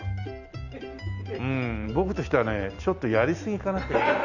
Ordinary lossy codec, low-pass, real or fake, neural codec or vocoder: none; 7.2 kHz; real; none